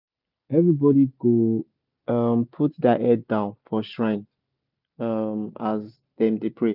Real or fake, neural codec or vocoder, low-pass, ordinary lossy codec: real; none; 5.4 kHz; none